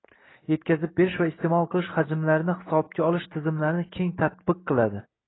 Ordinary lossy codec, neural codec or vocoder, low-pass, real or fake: AAC, 16 kbps; none; 7.2 kHz; real